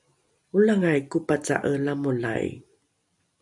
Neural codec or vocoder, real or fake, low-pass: none; real; 10.8 kHz